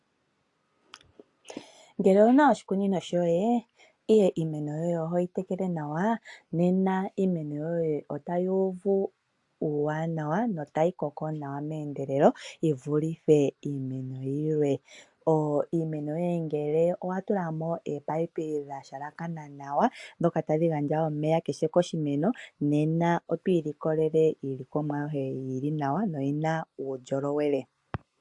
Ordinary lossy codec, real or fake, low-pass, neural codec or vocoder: AAC, 64 kbps; real; 10.8 kHz; none